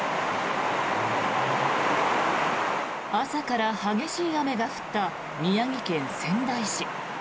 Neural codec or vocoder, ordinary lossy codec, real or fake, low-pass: none; none; real; none